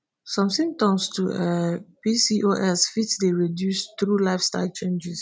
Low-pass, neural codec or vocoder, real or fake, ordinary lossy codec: none; none; real; none